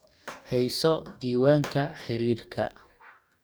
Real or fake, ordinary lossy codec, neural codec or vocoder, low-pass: fake; none; codec, 44.1 kHz, 2.6 kbps, DAC; none